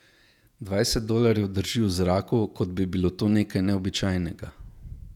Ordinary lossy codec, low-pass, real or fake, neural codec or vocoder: none; 19.8 kHz; fake; vocoder, 48 kHz, 128 mel bands, Vocos